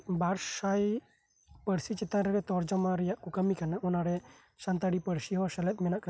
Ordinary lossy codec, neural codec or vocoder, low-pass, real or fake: none; none; none; real